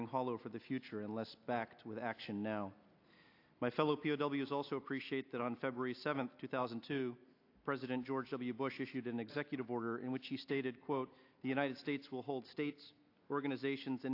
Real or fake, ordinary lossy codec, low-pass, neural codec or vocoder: real; AAC, 32 kbps; 5.4 kHz; none